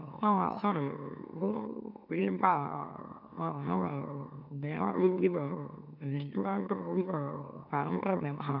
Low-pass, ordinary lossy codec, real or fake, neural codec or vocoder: 5.4 kHz; none; fake; autoencoder, 44.1 kHz, a latent of 192 numbers a frame, MeloTTS